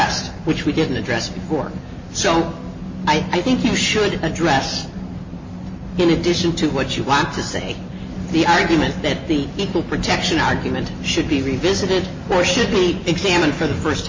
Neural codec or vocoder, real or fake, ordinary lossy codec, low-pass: none; real; MP3, 32 kbps; 7.2 kHz